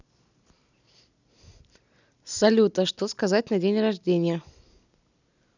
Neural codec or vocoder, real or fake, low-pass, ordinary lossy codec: none; real; 7.2 kHz; none